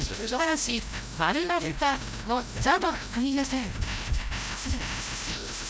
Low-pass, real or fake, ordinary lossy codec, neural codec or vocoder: none; fake; none; codec, 16 kHz, 0.5 kbps, FreqCodec, larger model